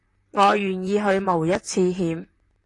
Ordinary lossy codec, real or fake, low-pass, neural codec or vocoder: AAC, 48 kbps; fake; 10.8 kHz; vocoder, 44.1 kHz, 128 mel bands, Pupu-Vocoder